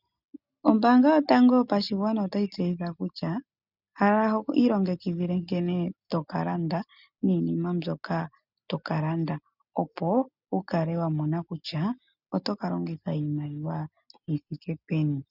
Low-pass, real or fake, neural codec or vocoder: 5.4 kHz; real; none